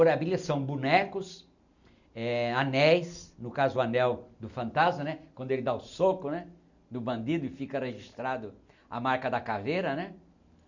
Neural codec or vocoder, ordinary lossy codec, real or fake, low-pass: none; none; real; 7.2 kHz